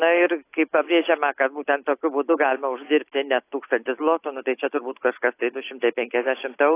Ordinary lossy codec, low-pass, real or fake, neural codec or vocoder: AAC, 24 kbps; 3.6 kHz; real; none